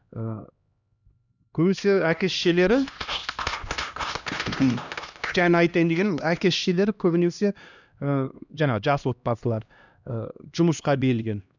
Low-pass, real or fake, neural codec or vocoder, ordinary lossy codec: 7.2 kHz; fake; codec, 16 kHz, 1 kbps, X-Codec, HuBERT features, trained on LibriSpeech; none